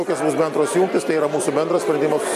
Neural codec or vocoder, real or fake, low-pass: none; real; 14.4 kHz